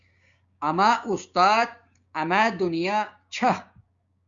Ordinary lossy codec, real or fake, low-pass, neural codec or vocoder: Opus, 64 kbps; fake; 7.2 kHz; codec, 16 kHz, 6 kbps, DAC